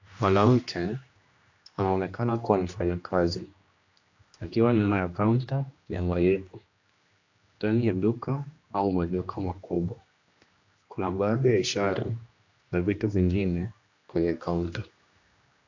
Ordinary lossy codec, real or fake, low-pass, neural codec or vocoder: AAC, 48 kbps; fake; 7.2 kHz; codec, 16 kHz, 1 kbps, X-Codec, HuBERT features, trained on general audio